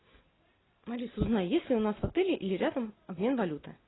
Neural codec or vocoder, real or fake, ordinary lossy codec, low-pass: none; real; AAC, 16 kbps; 7.2 kHz